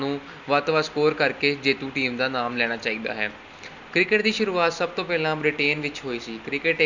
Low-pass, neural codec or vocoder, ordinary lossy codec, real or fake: 7.2 kHz; none; none; real